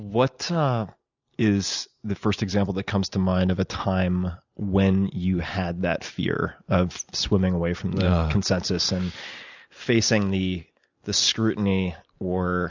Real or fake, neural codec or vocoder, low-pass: real; none; 7.2 kHz